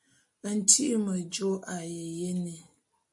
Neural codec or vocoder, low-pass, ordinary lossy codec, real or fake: none; 10.8 kHz; MP3, 48 kbps; real